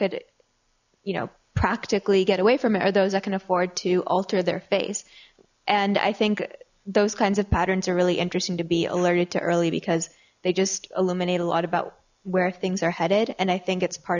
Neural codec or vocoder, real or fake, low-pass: none; real; 7.2 kHz